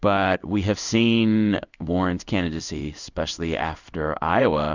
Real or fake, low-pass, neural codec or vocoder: fake; 7.2 kHz; codec, 16 kHz in and 24 kHz out, 1 kbps, XY-Tokenizer